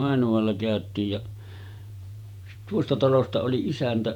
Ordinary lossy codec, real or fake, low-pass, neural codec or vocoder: none; fake; 19.8 kHz; vocoder, 48 kHz, 128 mel bands, Vocos